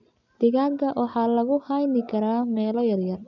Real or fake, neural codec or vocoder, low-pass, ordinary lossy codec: real; none; 7.2 kHz; none